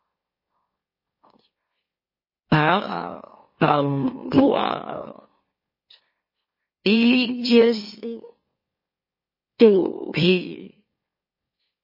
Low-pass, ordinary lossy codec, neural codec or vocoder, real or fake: 5.4 kHz; MP3, 24 kbps; autoencoder, 44.1 kHz, a latent of 192 numbers a frame, MeloTTS; fake